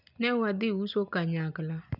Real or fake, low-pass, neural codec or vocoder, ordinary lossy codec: real; 5.4 kHz; none; none